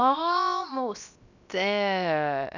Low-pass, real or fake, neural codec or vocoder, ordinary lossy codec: 7.2 kHz; fake; codec, 16 kHz, 0.7 kbps, FocalCodec; none